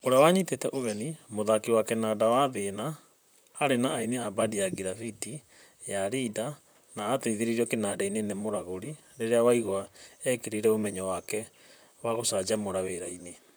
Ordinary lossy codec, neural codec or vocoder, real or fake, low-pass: none; vocoder, 44.1 kHz, 128 mel bands, Pupu-Vocoder; fake; none